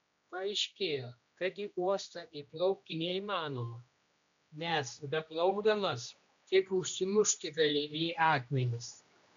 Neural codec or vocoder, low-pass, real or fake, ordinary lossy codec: codec, 16 kHz, 1 kbps, X-Codec, HuBERT features, trained on general audio; 7.2 kHz; fake; MP3, 64 kbps